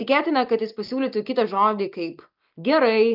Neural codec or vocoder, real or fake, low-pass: none; real; 5.4 kHz